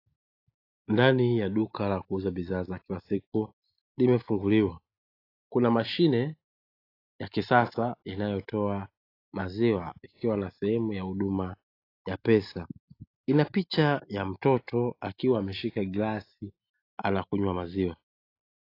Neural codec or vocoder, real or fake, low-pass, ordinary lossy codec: none; real; 5.4 kHz; AAC, 32 kbps